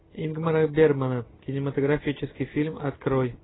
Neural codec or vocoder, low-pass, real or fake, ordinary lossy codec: none; 7.2 kHz; real; AAC, 16 kbps